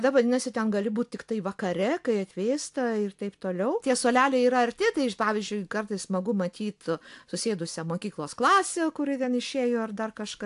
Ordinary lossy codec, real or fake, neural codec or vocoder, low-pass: AAC, 64 kbps; real; none; 10.8 kHz